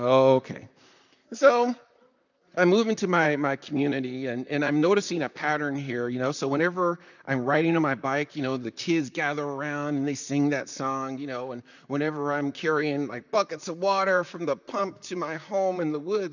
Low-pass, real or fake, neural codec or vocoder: 7.2 kHz; fake; vocoder, 44.1 kHz, 128 mel bands, Pupu-Vocoder